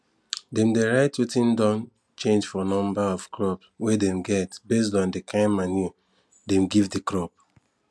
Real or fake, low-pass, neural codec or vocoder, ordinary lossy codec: real; none; none; none